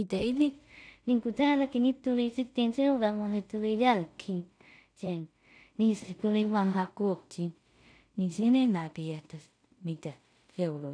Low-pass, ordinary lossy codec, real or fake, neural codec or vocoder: 9.9 kHz; none; fake; codec, 16 kHz in and 24 kHz out, 0.4 kbps, LongCat-Audio-Codec, two codebook decoder